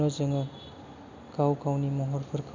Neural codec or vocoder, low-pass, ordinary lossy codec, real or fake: none; 7.2 kHz; MP3, 64 kbps; real